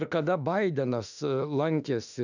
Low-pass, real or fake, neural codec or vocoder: 7.2 kHz; fake; autoencoder, 48 kHz, 32 numbers a frame, DAC-VAE, trained on Japanese speech